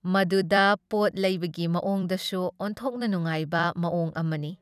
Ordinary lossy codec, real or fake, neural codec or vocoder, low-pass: none; fake; vocoder, 44.1 kHz, 128 mel bands every 256 samples, BigVGAN v2; 14.4 kHz